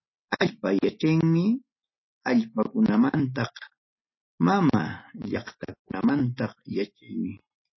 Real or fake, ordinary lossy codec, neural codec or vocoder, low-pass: real; MP3, 24 kbps; none; 7.2 kHz